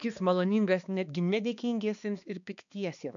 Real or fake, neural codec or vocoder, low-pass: fake; codec, 16 kHz, 2 kbps, X-Codec, HuBERT features, trained on balanced general audio; 7.2 kHz